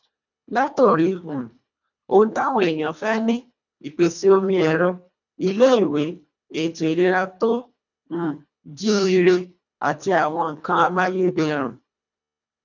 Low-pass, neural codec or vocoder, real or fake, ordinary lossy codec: 7.2 kHz; codec, 24 kHz, 1.5 kbps, HILCodec; fake; none